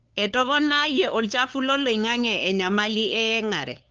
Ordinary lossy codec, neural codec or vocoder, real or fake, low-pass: Opus, 24 kbps; codec, 16 kHz, 2 kbps, FunCodec, trained on LibriTTS, 25 frames a second; fake; 7.2 kHz